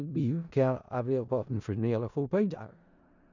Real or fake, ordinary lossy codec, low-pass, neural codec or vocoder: fake; none; 7.2 kHz; codec, 16 kHz in and 24 kHz out, 0.4 kbps, LongCat-Audio-Codec, four codebook decoder